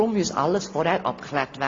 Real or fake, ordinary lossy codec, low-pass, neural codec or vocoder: real; AAC, 32 kbps; 7.2 kHz; none